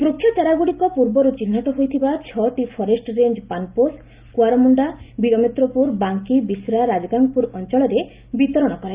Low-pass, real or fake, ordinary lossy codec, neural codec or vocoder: 3.6 kHz; real; Opus, 32 kbps; none